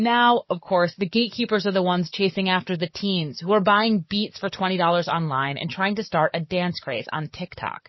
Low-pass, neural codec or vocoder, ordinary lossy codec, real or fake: 7.2 kHz; codec, 44.1 kHz, 7.8 kbps, DAC; MP3, 24 kbps; fake